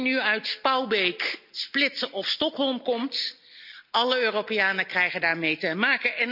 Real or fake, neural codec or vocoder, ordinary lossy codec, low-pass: real; none; none; 5.4 kHz